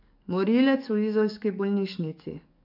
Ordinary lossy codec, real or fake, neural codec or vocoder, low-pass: none; fake; codec, 44.1 kHz, 7.8 kbps, DAC; 5.4 kHz